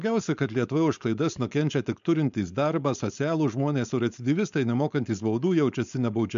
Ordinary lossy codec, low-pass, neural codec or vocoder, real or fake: MP3, 64 kbps; 7.2 kHz; codec, 16 kHz, 4.8 kbps, FACodec; fake